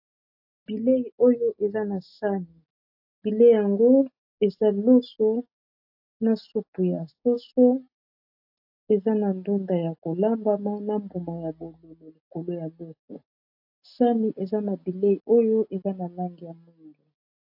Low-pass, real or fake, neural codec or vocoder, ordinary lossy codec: 5.4 kHz; real; none; AAC, 48 kbps